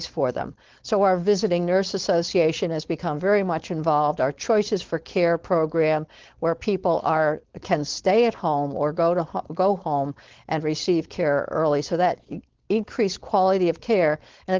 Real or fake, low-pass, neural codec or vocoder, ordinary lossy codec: fake; 7.2 kHz; codec, 16 kHz, 4.8 kbps, FACodec; Opus, 16 kbps